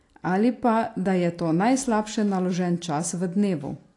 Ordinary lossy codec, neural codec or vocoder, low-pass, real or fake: AAC, 48 kbps; none; 10.8 kHz; real